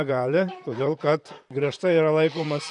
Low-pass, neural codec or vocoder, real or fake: 10.8 kHz; vocoder, 44.1 kHz, 128 mel bands, Pupu-Vocoder; fake